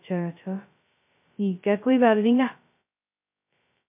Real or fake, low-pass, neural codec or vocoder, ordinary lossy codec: fake; 3.6 kHz; codec, 16 kHz, 0.2 kbps, FocalCodec; MP3, 32 kbps